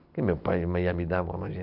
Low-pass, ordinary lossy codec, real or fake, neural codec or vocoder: 5.4 kHz; none; real; none